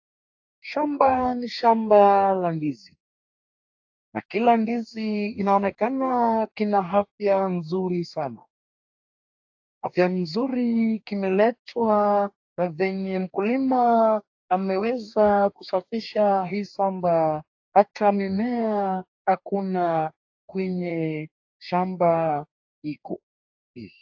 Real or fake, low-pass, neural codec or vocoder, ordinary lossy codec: fake; 7.2 kHz; codec, 44.1 kHz, 2.6 kbps, DAC; AAC, 48 kbps